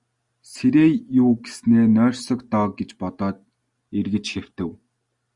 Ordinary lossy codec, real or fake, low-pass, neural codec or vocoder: Opus, 64 kbps; real; 10.8 kHz; none